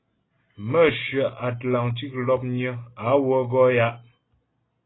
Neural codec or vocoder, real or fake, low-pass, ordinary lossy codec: none; real; 7.2 kHz; AAC, 16 kbps